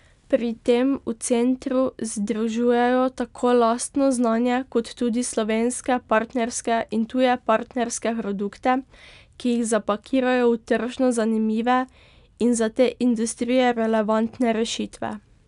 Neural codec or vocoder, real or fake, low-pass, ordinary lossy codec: none; real; 10.8 kHz; none